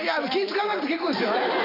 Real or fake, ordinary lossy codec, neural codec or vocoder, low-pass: fake; none; vocoder, 44.1 kHz, 128 mel bands every 512 samples, BigVGAN v2; 5.4 kHz